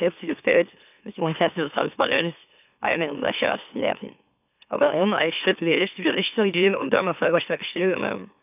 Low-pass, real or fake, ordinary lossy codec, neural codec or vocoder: 3.6 kHz; fake; none; autoencoder, 44.1 kHz, a latent of 192 numbers a frame, MeloTTS